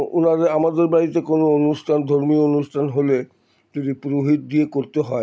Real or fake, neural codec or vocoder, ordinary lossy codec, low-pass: real; none; none; none